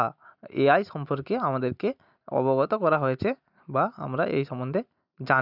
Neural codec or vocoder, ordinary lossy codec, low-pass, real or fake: none; none; 5.4 kHz; real